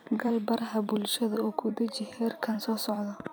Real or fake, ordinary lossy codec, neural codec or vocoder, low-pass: fake; none; vocoder, 44.1 kHz, 128 mel bands every 256 samples, BigVGAN v2; none